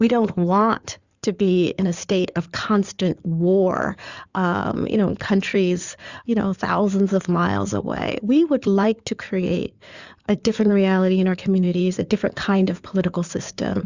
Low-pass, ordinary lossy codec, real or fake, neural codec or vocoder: 7.2 kHz; Opus, 64 kbps; fake; codec, 16 kHz in and 24 kHz out, 2.2 kbps, FireRedTTS-2 codec